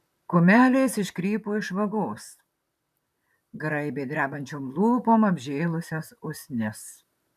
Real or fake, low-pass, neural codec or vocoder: fake; 14.4 kHz; vocoder, 44.1 kHz, 128 mel bands, Pupu-Vocoder